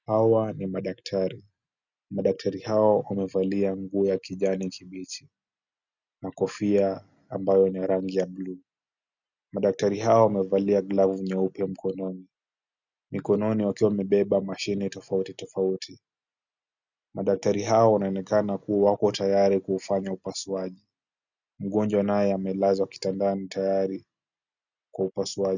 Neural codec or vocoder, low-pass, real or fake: none; 7.2 kHz; real